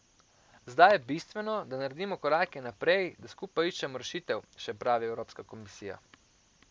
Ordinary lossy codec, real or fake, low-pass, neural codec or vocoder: none; real; none; none